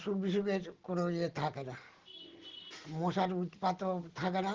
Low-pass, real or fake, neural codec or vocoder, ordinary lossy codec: 7.2 kHz; real; none; Opus, 16 kbps